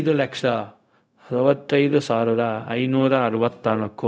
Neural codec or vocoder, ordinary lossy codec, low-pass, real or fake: codec, 16 kHz, 0.4 kbps, LongCat-Audio-Codec; none; none; fake